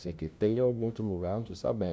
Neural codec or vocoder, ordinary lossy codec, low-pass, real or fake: codec, 16 kHz, 0.5 kbps, FunCodec, trained on LibriTTS, 25 frames a second; none; none; fake